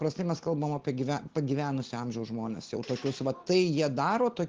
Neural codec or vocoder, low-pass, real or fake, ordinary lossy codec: none; 7.2 kHz; real; Opus, 16 kbps